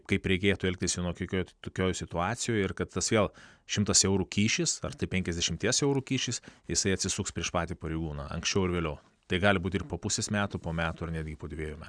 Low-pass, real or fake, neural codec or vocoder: 9.9 kHz; real; none